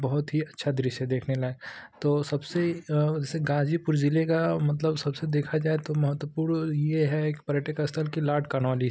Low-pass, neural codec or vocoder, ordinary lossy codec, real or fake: none; none; none; real